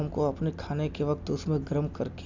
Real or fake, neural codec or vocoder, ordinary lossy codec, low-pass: real; none; none; 7.2 kHz